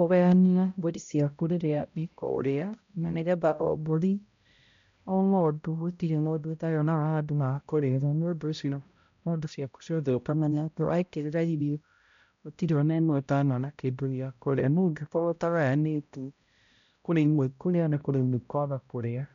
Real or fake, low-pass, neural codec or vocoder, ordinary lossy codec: fake; 7.2 kHz; codec, 16 kHz, 0.5 kbps, X-Codec, HuBERT features, trained on balanced general audio; MP3, 64 kbps